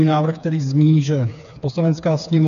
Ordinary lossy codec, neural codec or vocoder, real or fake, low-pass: AAC, 96 kbps; codec, 16 kHz, 4 kbps, FreqCodec, smaller model; fake; 7.2 kHz